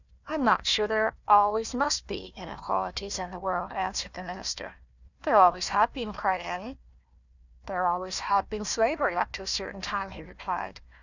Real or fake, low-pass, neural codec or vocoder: fake; 7.2 kHz; codec, 16 kHz, 1 kbps, FunCodec, trained on Chinese and English, 50 frames a second